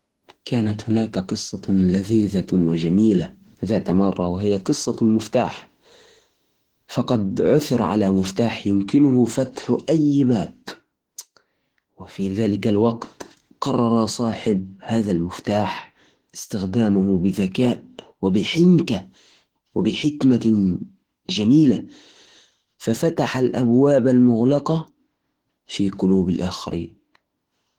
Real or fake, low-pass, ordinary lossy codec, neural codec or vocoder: fake; 19.8 kHz; Opus, 16 kbps; autoencoder, 48 kHz, 32 numbers a frame, DAC-VAE, trained on Japanese speech